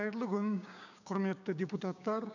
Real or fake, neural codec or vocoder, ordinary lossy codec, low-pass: fake; codec, 16 kHz, 6 kbps, DAC; none; 7.2 kHz